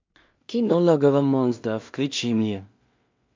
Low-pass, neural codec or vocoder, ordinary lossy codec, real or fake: 7.2 kHz; codec, 16 kHz in and 24 kHz out, 0.4 kbps, LongCat-Audio-Codec, two codebook decoder; MP3, 48 kbps; fake